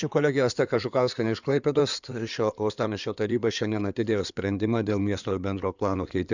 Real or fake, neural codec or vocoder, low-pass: fake; codec, 16 kHz in and 24 kHz out, 2.2 kbps, FireRedTTS-2 codec; 7.2 kHz